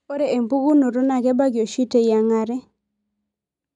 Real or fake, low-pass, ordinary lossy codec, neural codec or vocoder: real; 10.8 kHz; none; none